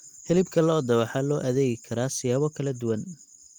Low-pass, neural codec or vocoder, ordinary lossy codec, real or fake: 19.8 kHz; none; Opus, 32 kbps; real